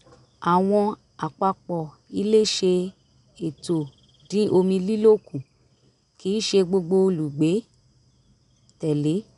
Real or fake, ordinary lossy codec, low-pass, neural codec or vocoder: real; MP3, 96 kbps; 10.8 kHz; none